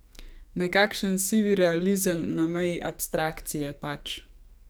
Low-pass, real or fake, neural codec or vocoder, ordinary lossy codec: none; fake; codec, 44.1 kHz, 2.6 kbps, SNAC; none